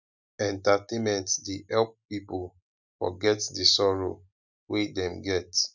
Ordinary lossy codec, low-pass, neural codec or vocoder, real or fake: none; 7.2 kHz; none; real